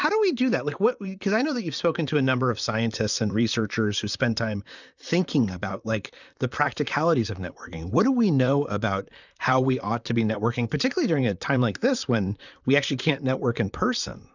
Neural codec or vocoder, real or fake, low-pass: vocoder, 44.1 kHz, 128 mel bands, Pupu-Vocoder; fake; 7.2 kHz